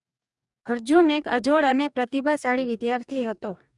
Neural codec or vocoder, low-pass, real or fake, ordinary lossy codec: codec, 44.1 kHz, 2.6 kbps, DAC; 10.8 kHz; fake; none